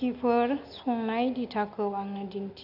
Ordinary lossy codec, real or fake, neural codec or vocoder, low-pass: none; real; none; 5.4 kHz